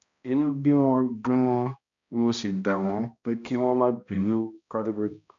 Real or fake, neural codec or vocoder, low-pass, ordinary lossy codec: fake; codec, 16 kHz, 1 kbps, X-Codec, HuBERT features, trained on balanced general audio; 7.2 kHz; MP3, 64 kbps